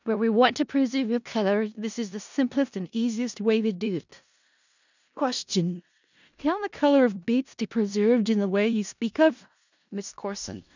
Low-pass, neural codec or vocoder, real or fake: 7.2 kHz; codec, 16 kHz in and 24 kHz out, 0.4 kbps, LongCat-Audio-Codec, four codebook decoder; fake